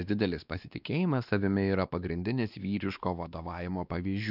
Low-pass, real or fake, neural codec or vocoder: 5.4 kHz; fake; codec, 16 kHz, 2 kbps, X-Codec, WavLM features, trained on Multilingual LibriSpeech